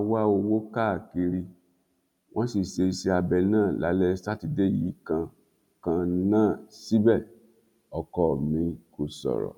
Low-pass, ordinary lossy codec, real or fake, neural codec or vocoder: 19.8 kHz; none; real; none